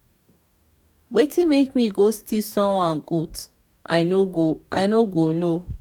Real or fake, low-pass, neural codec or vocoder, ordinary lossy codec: fake; 19.8 kHz; codec, 44.1 kHz, 2.6 kbps, DAC; none